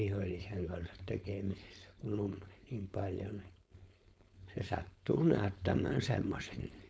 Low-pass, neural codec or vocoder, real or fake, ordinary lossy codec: none; codec, 16 kHz, 4.8 kbps, FACodec; fake; none